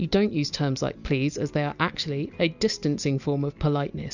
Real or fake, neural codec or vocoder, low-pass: real; none; 7.2 kHz